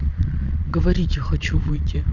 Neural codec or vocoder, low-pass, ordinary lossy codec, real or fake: vocoder, 22.05 kHz, 80 mel bands, Vocos; 7.2 kHz; none; fake